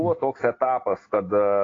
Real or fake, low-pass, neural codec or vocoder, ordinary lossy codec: real; 7.2 kHz; none; AAC, 32 kbps